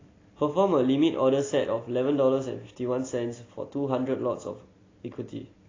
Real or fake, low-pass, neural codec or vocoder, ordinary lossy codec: real; 7.2 kHz; none; AAC, 32 kbps